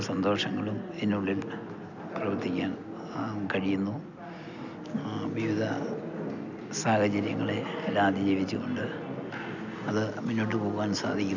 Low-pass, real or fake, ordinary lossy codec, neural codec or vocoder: 7.2 kHz; real; none; none